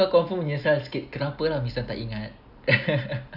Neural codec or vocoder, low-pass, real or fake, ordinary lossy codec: none; 5.4 kHz; real; none